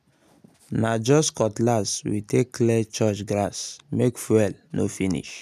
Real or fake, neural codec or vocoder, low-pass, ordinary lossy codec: real; none; 14.4 kHz; none